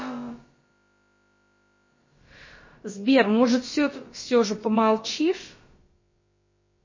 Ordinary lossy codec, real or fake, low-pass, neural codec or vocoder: MP3, 32 kbps; fake; 7.2 kHz; codec, 16 kHz, about 1 kbps, DyCAST, with the encoder's durations